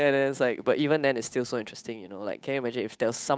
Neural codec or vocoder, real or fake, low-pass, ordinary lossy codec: codec, 16 kHz, 8 kbps, FunCodec, trained on Chinese and English, 25 frames a second; fake; none; none